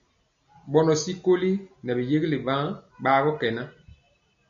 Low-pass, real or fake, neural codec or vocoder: 7.2 kHz; real; none